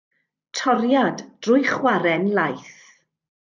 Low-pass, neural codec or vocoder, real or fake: 7.2 kHz; vocoder, 44.1 kHz, 128 mel bands every 256 samples, BigVGAN v2; fake